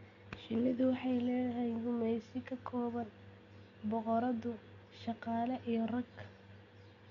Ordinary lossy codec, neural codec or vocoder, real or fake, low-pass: none; none; real; 7.2 kHz